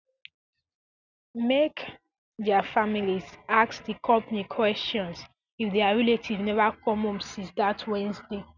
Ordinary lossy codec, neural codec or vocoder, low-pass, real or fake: none; none; 7.2 kHz; real